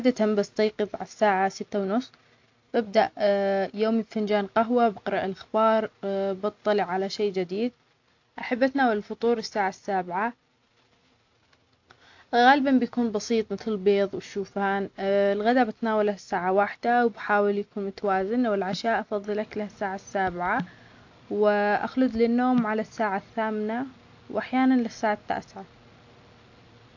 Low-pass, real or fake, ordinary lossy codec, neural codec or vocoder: 7.2 kHz; real; AAC, 48 kbps; none